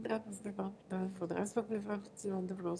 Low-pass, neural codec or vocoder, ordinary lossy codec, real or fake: none; autoencoder, 22.05 kHz, a latent of 192 numbers a frame, VITS, trained on one speaker; none; fake